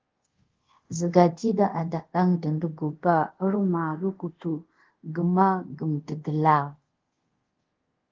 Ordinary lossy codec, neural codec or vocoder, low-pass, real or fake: Opus, 16 kbps; codec, 24 kHz, 0.5 kbps, DualCodec; 7.2 kHz; fake